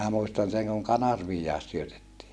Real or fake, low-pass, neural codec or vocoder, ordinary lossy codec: real; none; none; none